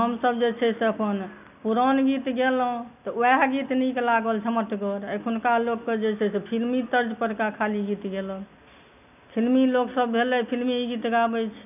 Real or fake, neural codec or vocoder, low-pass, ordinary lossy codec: real; none; 3.6 kHz; none